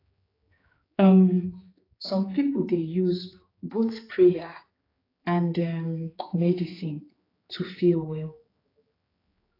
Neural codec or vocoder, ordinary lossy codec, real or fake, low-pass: codec, 16 kHz, 4 kbps, X-Codec, HuBERT features, trained on general audio; AAC, 24 kbps; fake; 5.4 kHz